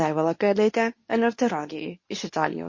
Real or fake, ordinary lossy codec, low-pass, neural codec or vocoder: fake; MP3, 32 kbps; 7.2 kHz; codec, 24 kHz, 0.9 kbps, WavTokenizer, medium speech release version 2